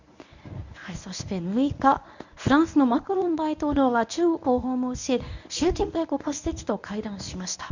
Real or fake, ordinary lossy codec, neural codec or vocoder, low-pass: fake; none; codec, 24 kHz, 0.9 kbps, WavTokenizer, medium speech release version 1; 7.2 kHz